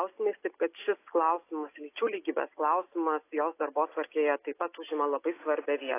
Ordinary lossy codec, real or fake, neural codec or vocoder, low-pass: AAC, 24 kbps; real; none; 3.6 kHz